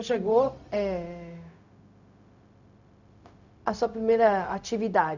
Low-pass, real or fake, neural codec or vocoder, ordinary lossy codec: 7.2 kHz; fake; codec, 16 kHz, 0.4 kbps, LongCat-Audio-Codec; none